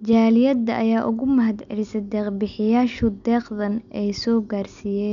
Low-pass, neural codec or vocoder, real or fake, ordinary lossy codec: 7.2 kHz; none; real; none